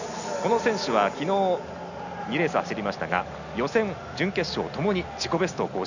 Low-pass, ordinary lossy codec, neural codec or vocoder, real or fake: 7.2 kHz; none; none; real